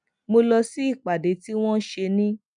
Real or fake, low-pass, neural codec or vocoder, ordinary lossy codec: real; 9.9 kHz; none; MP3, 96 kbps